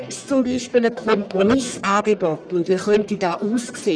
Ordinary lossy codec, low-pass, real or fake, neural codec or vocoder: none; 9.9 kHz; fake; codec, 44.1 kHz, 1.7 kbps, Pupu-Codec